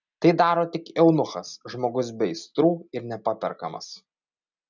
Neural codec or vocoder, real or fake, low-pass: none; real; 7.2 kHz